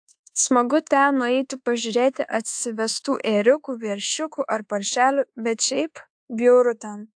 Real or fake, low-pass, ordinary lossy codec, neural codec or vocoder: fake; 9.9 kHz; AAC, 64 kbps; codec, 24 kHz, 1.2 kbps, DualCodec